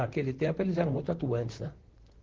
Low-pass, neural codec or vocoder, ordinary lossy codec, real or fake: 7.2 kHz; vocoder, 44.1 kHz, 128 mel bands, Pupu-Vocoder; Opus, 16 kbps; fake